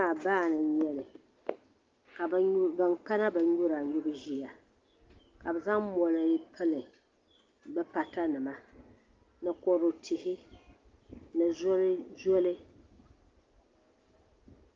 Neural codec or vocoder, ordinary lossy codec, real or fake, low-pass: none; Opus, 32 kbps; real; 7.2 kHz